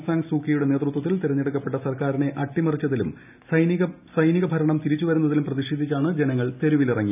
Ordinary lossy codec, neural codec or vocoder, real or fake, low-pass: none; none; real; 3.6 kHz